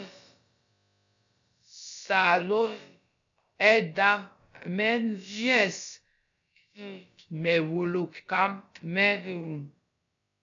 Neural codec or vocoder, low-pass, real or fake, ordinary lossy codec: codec, 16 kHz, about 1 kbps, DyCAST, with the encoder's durations; 7.2 kHz; fake; MP3, 64 kbps